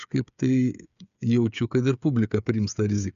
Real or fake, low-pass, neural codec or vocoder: fake; 7.2 kHz; codec, 16 kHz, 8 kbps, FreqCodec, smaller model